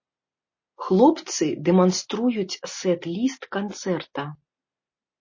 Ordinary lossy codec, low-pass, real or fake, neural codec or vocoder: MP3, 32 kbps; 7.2 kHz; real; none